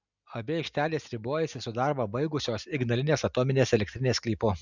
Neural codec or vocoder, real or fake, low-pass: none; real; 7.2 kHz